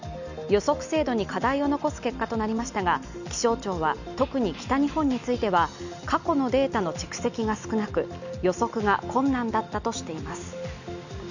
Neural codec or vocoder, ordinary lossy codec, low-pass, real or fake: none; none; 7.2 kHz; real